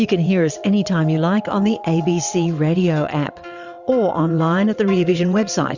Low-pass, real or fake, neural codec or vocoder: 7.2 kHz; fake; vocoder, 22.05 kHz, 80 mel bands, WaveNeXt